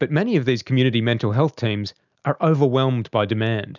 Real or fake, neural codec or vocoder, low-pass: real; none; 7.2 kHz